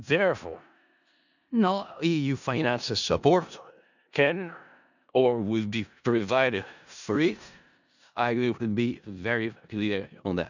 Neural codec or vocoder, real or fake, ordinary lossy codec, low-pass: codec, 16 kHz in and 24 kHz out, 0.4 kbps, LongCat-Audio-Codec, four codebook decoder; fake; none; 7.2 kHz